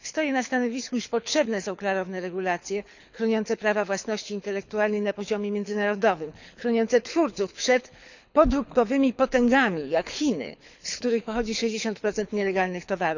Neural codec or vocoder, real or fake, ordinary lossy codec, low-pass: codec, 24 kHz, 6 kbps, HILCodec; fake; none; 7.2 kHz